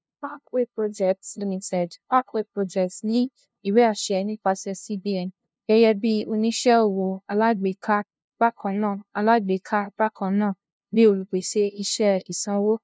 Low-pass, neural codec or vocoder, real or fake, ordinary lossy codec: none; codec, 16 kHz, 0.5 kbps, FunCodec, trained on LibriTTS, 25 frames a second; fake; none